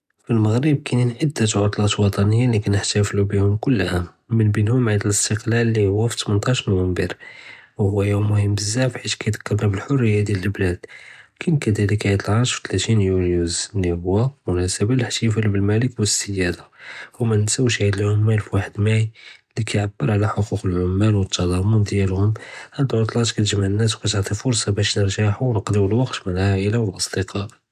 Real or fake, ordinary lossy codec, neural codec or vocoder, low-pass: real; none; none; 14.4 kHz